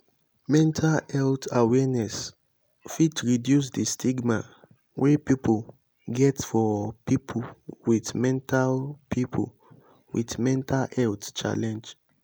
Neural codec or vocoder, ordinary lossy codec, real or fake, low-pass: none; none; real; none